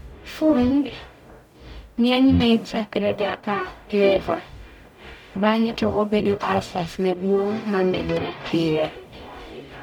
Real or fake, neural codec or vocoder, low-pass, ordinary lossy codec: fake; codec, 44.1 kHz, 0.9 kbps, DAC; 19.8 kHz; none